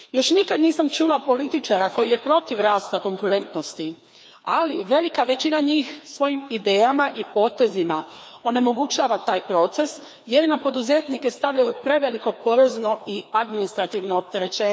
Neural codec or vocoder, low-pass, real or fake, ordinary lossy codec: codec, 16 kHz, 2 kbps, FreqCodec, larger model; none; fake; none